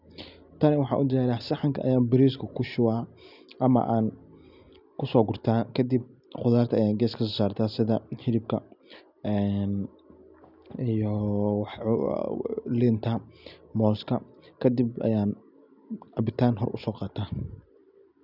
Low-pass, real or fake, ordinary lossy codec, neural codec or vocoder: 5.4 kHz; real; none; none